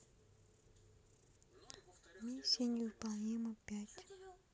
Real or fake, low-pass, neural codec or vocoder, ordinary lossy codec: real; none; none; none